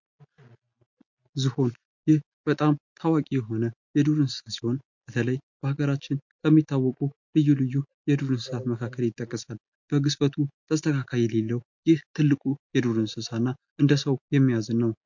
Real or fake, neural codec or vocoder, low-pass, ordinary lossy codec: real; none; 7.2 kHz; MP3, 48 kbps